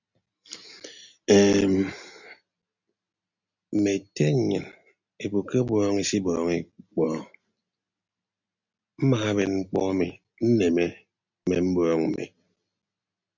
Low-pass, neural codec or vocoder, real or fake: 7.2 kHz; none; real